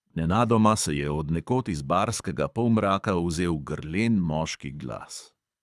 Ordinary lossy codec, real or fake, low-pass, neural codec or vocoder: none; fake; none; codec, 24 kHz, 6 kbps, HILCodec